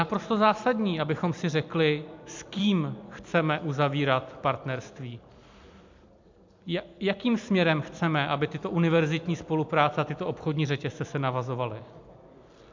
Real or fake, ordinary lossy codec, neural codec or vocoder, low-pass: fake; MP3, 64 kbps; vocoder, 44.1 kHz, 128 mel bands every 256 samples, BigVGAN v2; 7.2 kHz